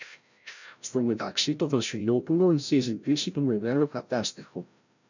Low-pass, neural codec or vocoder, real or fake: 7.2 kHz; codec, 16 kHz, 0.5 kbps, FreqCodec, larger model; fake